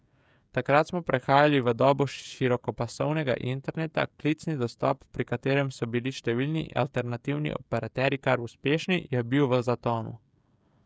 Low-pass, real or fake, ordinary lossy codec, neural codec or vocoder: none; fake; none; codec, 16 kHz, 16 kbps, FreqCodec, smaller model